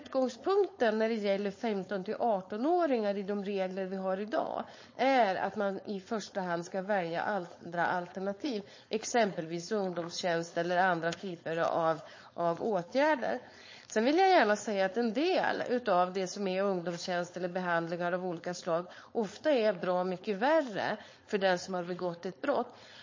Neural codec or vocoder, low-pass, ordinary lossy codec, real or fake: codec, 16 kHz, 4.8 kbps, FACodec; 7.2 kHz; MP3, 32 kbps; fake